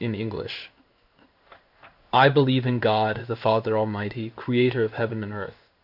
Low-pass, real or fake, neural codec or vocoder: 5.4 kHz; fake; codec, 16 kHz in and 24 kHz out, 1 kbps, XY-Tokenizer